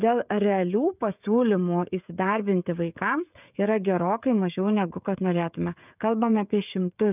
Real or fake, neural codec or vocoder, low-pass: fake; codec, 16 kHz, 16 kbps, FreqCodec, smaller model; 3.6 kHz